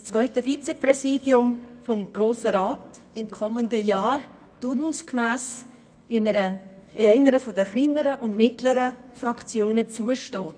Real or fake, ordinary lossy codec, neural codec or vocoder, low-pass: fake; none; codec, 24 kHz, 0.9 kbps, WavTokenizer, medium music audio release; 9.9 kHz